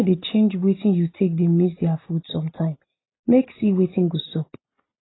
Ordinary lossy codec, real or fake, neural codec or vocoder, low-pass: AAC, 16 kbps; real; none; 7.2 kHz